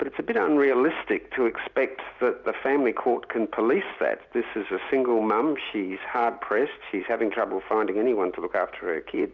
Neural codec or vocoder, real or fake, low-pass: none; real; 7.2 kHz